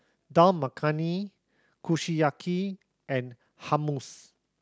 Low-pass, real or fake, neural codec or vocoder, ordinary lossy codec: none; real; none; none